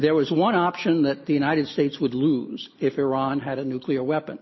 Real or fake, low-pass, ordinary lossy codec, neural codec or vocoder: real; 7.2 kHz; MP3, 24 kbps; none